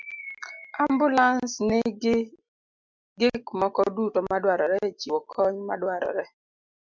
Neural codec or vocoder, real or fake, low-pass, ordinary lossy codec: none; real; 7.2 kHz; MP3, 64 kbps